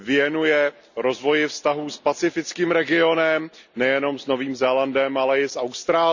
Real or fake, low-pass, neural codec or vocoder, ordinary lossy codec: real; 7.2 kHz; none; none